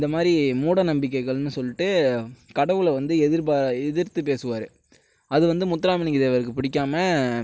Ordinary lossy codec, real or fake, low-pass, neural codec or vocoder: none; real; none; none